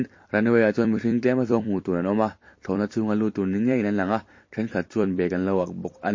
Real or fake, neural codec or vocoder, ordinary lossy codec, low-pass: fake; vocoder, 44.1 kHz, 128 mel bands every 256 samples, BigVGAN v2; MP3, 32 kbps; 7.2 kHz